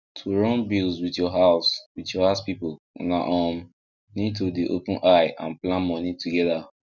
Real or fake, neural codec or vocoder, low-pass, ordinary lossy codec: real; none; 7.2 kHz; none